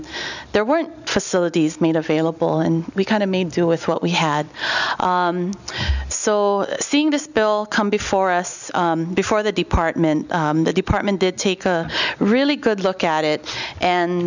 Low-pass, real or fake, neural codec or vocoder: 7.2 kHz; real; none